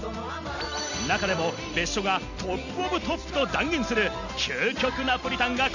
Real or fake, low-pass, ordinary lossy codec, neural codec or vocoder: real; 7.2 kHz; none; none